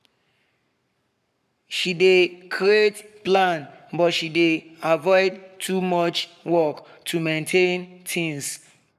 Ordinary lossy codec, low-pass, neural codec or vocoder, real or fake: none; 14.4 kHz; codec, 44.1 kHz, 7.8 kbps, Pupu-Codec; fake